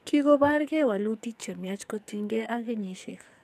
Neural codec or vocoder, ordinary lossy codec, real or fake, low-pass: codec, 44.1 kHz, 2.6 kbps, SNAC; none; fake; 14.4 kHz